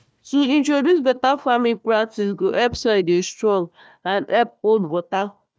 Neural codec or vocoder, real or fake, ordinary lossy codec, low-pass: codec, 16 kHz, 1 kbps, FunCodec, trained on Chinese and English, 50 frames a second; fake; none; none